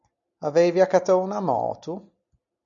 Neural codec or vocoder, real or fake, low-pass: none; real; 7.2 kHz